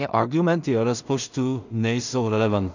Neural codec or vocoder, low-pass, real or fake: codec, 16 kHz in and 24 kHz out, 0.4 kbps, LongCat-Audio-Codec, two codebook decoder; 7.2 kHz; fake